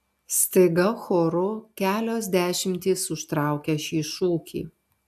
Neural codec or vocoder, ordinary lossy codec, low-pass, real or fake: none; AAC, 96 kbps; 14.4 kHz; real